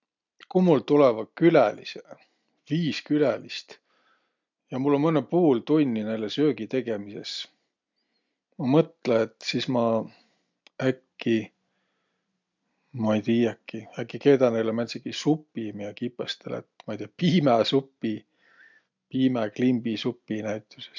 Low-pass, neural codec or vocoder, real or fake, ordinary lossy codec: 7.2 kHz; none; real; MP3, 64 kbps